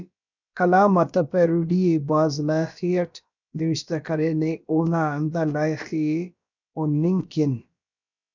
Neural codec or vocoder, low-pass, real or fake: codec, 16 kHz, about 1 kbps, DyCAST, with the encoder's durations; 7.2 kHz; fake